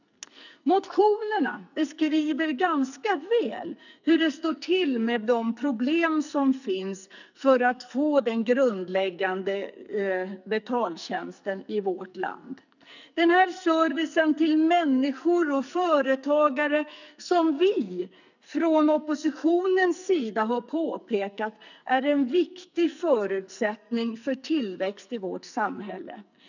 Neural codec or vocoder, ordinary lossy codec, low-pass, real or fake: codec, 44.1 kHz, 2.6 kbps, SNAC; none; 7.2 kHz; fake